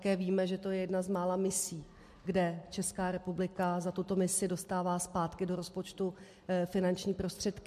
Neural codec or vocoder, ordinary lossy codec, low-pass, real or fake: none; MP3, 64 kbps; 14.4 kHz; real